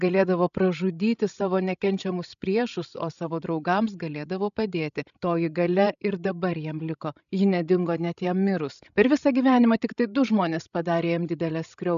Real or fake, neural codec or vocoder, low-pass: fake; codec, 16 kHz, 16 kbps, FreqCodec, larger model; 7.2 kHz